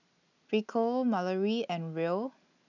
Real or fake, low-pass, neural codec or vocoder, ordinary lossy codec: real; 7.2 kHz; none; none